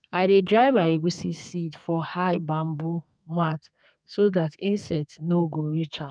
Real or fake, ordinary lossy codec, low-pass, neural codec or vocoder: fake; none; 9.9 kHz; codec, 32 kHz, 1.9 kbps, SNAC